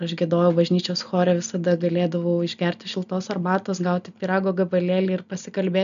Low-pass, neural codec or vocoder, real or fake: 7.2 kHz; none; real